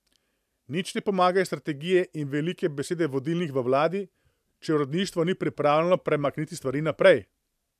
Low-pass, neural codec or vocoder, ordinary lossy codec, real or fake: 14.4 kHz; vocoder, 44.1 kHz, 128 mel bands every 512 samples, BigVGAN v2; none; fake